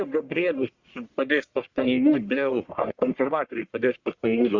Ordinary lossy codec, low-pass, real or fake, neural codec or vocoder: Opus, 64 kbps; 7.2 kHz; fake; codec, 44.1 kHz, 1.7 kbps, Pupu-Codec